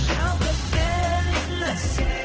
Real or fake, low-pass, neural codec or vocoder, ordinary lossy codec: fake; 7.2 kHz; codec, 16 kHz in and 24 kHz out, 1 kbps, XY-Tokenizer; Opus, 16 kbps